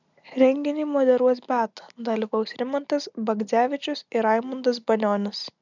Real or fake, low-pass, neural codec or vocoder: real; 7.2 kHz; none